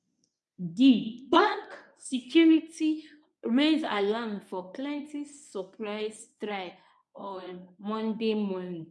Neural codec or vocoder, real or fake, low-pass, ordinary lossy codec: codec, 24 kHz, 0.9 kbps, WavTokenizer, medium speech release version 1; fake; none; none